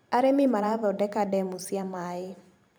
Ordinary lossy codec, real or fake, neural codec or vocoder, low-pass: none; fake; vocoder, 44.1 kHz, 128 mel bands every 512 samples, BigVGAN v2; none